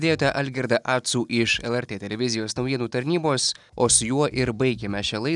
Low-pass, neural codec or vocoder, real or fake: 10.8 kHz; none; real